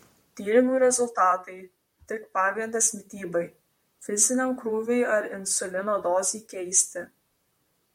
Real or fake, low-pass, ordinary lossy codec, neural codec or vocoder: fake; 19.8 kHz; MP3, 64 kbps; vocoder, 44.1 kHz, 128 mel bands, Pupu-Vocoder